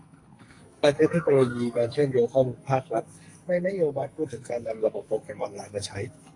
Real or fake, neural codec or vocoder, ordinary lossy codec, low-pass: fake; codec, 44.1 kHz, 2.6 kbps, SNAC; AAC, 48 kbps; 10.8 kHz